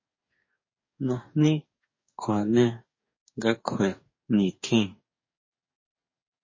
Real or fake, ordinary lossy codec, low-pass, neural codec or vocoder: fake; MP3, 32 kbps; 7.2 kHz; codec, 44.1 kHz, 2.6 kbps, DAC